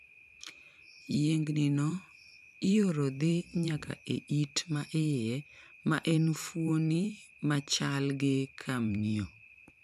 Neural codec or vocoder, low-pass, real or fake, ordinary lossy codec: vocoder, 44.1 kHz, 128 mel bands every 512 samples, BigVGAN v2; 14.4 kHz; fake; none